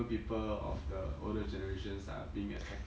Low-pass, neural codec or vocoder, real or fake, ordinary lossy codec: none; none; real; none